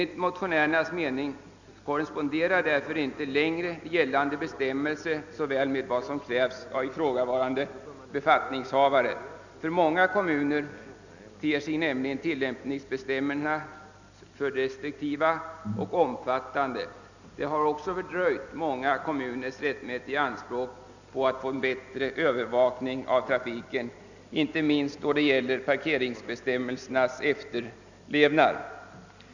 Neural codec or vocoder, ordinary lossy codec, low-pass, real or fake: none; none; 7.2 kHz; real